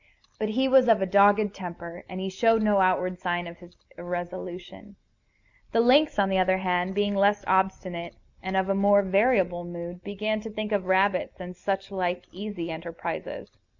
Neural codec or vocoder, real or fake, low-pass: none; real; 7.2 kHz